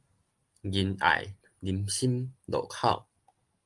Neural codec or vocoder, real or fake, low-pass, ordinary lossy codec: none; real; 10.8 kHz; Opus, 32 kbps